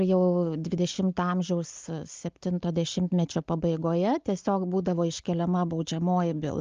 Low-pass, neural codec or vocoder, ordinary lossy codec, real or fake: 7.2 kHz; none; Opus, 32 kbps; real